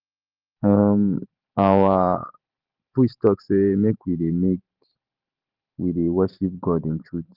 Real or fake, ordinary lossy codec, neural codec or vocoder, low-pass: fake; Opus, 24 kbps; vocoder, 44.1 kHz, 128 mel bands every 512 samples, BigVGAN v2; 5.4 kHz